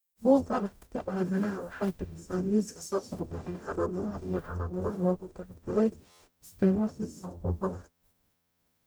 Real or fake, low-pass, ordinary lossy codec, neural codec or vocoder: fake; none; none; codec, 44.1 kHz, 0.9 kbps, DAC